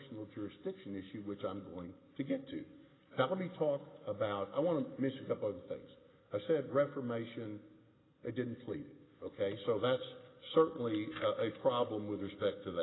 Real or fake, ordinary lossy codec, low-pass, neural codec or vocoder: real; AAC, 16 kbps; 7.2 kHz; none